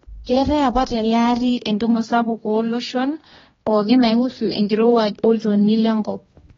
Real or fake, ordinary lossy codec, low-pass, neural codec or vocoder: fake; AAC, 24 kbps; 7.2 kHz; codec, 16 kHz, 1 kbps, X-Codec, HuBERT features, trained on general audio